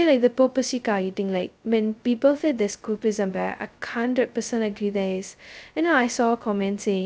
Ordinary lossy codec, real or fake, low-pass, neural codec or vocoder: none; fake; none; codec, 16 kHz, 0.2 kbps, FocalCodec